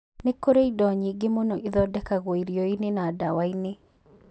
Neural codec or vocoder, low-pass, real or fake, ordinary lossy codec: none; none; real; none